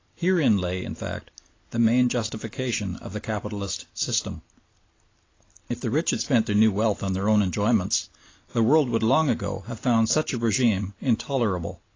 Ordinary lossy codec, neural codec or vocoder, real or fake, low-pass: AAC, 32 kbps; none; real; 7.2 kHz